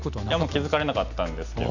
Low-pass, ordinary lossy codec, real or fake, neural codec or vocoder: 7.2 kHz; none; real; none